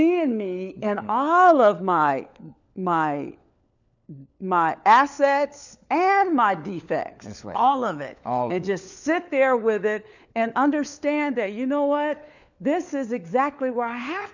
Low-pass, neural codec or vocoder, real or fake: 7.2 kHz; codec, 16 kHz, 4 kbps, FunCodec, trained on LibriTTS, 50 frames a second; fake